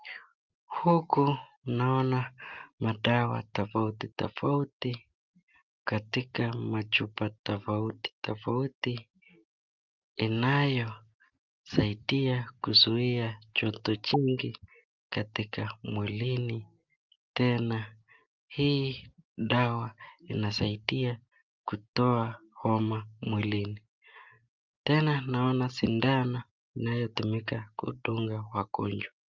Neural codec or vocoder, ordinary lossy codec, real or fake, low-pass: none; Opus, 24 kbps; real; 7.2 kHz